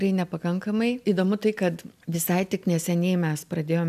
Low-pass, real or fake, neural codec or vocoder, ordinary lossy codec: 14.4 kHz; real; none; AAC, 96 kbps